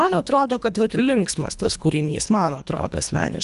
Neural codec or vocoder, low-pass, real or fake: codec, 24 kHz, 1.5 kbps, HILCodec; 10.8 kHz; fake